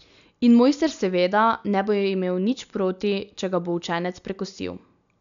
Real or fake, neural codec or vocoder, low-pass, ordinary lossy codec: real; none; 7.2 kHz; none